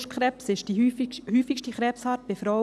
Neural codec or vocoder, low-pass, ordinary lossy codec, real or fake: none; none; none; real